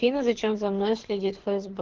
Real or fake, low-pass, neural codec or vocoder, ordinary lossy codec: fake; 7.2 kHz; codec, 24 kHz, 6 kbps, HILCodec; Opus, 16 kbps